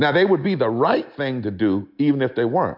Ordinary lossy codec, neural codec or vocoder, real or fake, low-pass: MP3, 48 kbps; none; real; 5.4 kHz